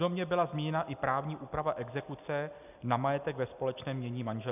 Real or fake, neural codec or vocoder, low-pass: real; none; 3.6 kHz